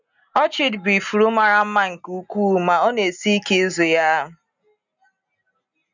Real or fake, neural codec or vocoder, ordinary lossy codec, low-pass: real; none; none; 7.2 kHz